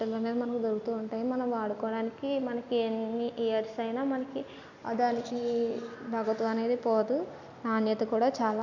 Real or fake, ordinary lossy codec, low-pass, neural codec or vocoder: real; none; 7.2 kHz; none